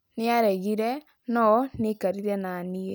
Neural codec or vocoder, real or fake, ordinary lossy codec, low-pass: none; real; none; none